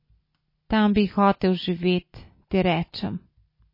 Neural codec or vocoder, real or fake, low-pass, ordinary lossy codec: none; real; 5.4 kHz; MP3, 24 kbps